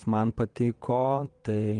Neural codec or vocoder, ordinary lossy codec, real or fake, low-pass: vocoder, 22.05 kHz, 80 mel bands, WaveNeXt; Opus, 24 kbps; fake; 9.9 kHz